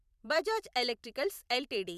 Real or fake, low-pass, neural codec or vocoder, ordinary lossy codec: fake; 14.4 kHz; vocoder, 44.1 kHz, 128 mel bands every 256 samples, BigVGAN v2; none